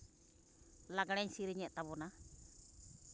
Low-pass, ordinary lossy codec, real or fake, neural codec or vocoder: none; none; real; none